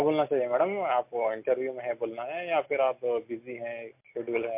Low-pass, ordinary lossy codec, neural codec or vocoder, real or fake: 3.6 kHz; MP3, 32 kbps; none; real